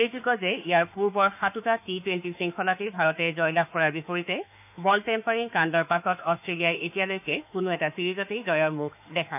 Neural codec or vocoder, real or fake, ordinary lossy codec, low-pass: autoencoder, 48 kHz, 32 numbers a frame, DAC-VAE, trained on Japanese speech; fake; none; 3.6 kHz